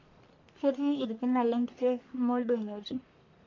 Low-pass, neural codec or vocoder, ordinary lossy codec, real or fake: 7.2 kHz; codec, 44.1 kHz, 1.7 kbps, Pupu-Codec; MP3, 48 kbps; fake